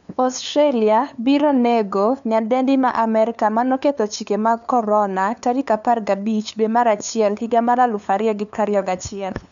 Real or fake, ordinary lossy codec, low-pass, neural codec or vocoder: fake; none; 7.2 kHz; codec, 16 kHz, 2 kbps, FunCodec, trained on LibriTTS, 25 frames a second